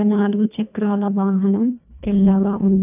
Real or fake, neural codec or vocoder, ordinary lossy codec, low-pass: fake; codec, 24 kHz, 1.5 kbps, HILCodec; none; 3.6 kHz